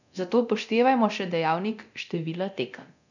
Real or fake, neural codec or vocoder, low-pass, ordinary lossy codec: fake; codec, 24 kHz, 0.9 kbps, DualCodec; 7.2 kHz; none